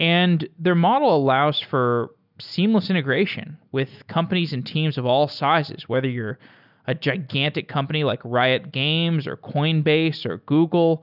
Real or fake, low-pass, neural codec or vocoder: real; 5.4 kHz; none